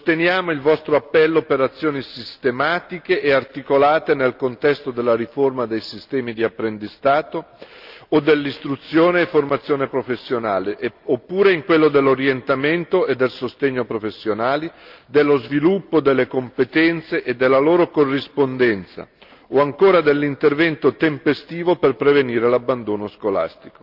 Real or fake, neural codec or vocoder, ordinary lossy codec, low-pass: real; none; Opus, 24 kbps; 5.4 kHz